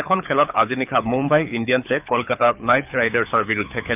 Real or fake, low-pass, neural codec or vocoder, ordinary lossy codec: fake; 3.6 kHz; codec, 24 kHz, 6 kbps, HILCodec; none